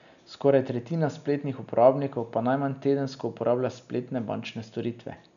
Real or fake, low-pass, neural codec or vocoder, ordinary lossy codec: real; 7.2 kHz; none; none